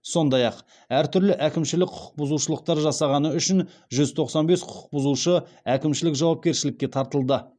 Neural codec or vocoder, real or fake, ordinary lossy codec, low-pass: none; real; none; 9.9 kHz